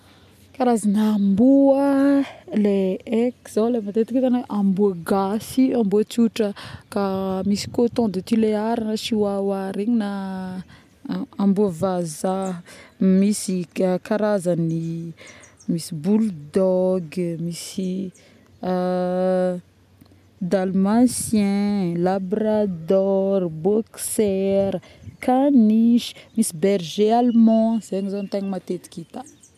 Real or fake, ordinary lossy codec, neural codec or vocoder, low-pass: real; none; none; 14.4 kHz